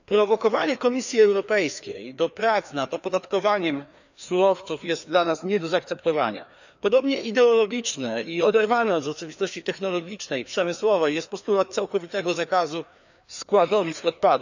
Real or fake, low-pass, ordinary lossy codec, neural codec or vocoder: fake; 7.2 kHz; none; codec, 16 kHz, 2 kbps, FreqCodec, larger model